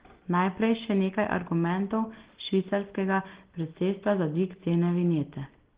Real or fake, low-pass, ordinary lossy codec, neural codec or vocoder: real; 3.6 kHz; Opus, 16 kbps; none